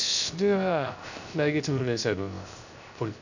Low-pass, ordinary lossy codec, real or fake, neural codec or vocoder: 7.2 kHz; none; fake; codec, 16 kHz, 0.3 kbps, FocalCodec